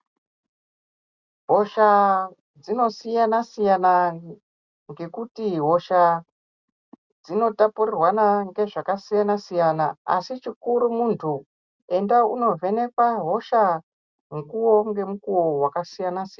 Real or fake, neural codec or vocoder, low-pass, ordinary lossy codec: real; none; 7.2 kHz; Opus, 64 kbps